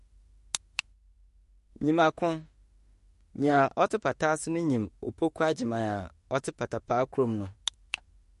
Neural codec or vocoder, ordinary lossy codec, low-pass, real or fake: autoencoder, 48 kHz, 32 numbers a frame, DAC-VAE, trained on Japanese speech; MP3, 48 kbps; 14.4 kHz; fake